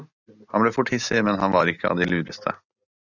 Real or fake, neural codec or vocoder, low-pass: real; none; 7.2 kHz